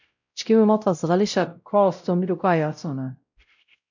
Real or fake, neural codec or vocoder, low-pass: fake; codec, 16 kHz, 0.5 kbps, X-Codec, WavLM features, trained on Multilingual LibriSpeech; 7.2 kHz